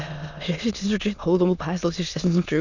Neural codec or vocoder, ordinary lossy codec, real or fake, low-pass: autoencoder, 22.05 kHz, a latent of 192 numbers a frame, VITS, trained on many speakers; none; fake; 7.2 kHz